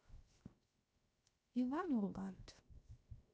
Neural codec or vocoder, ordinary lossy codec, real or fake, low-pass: codec, 16 kHz, 0.7 kbps, FocalCodec; none; fake; none